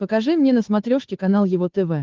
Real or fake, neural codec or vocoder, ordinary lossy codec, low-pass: fake; codec, 16 kHz, 8 kbps, FunCodec, trained on Chinese and English, 25 frames a second; Opus, 16 kbps; 7.2 kHz